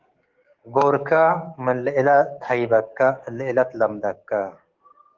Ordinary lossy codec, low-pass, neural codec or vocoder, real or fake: Opus, 16 kbps; 7.2 kHz; codec, 44.1 kHz, 7.8 kbps, DAC; fake